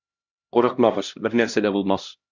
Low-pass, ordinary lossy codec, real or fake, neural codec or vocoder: 7.2 kHz; Opus, 64 kbps; fake; codec, 16 kHz, 1 kbps, X-Codec, HuBERT features, trained on LibriSpeech